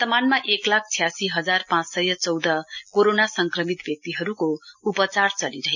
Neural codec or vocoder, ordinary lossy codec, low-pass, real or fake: none; none; 7.2 kHz; real